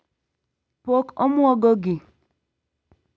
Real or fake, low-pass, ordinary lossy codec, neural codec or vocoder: real; none; none; none